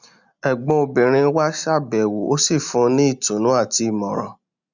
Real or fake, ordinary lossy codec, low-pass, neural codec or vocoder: real; none; 7.2 kHz; none